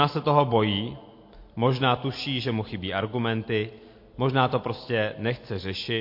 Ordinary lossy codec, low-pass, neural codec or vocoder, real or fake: MP3, 32 kbps; 5.4 kHz; none; real